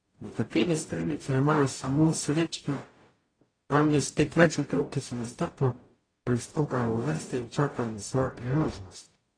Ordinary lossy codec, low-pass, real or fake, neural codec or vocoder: AAC, 32 kbps; 9.9 kHz; fake; codec, 44.1 kHz, 0.9 kbps, DAC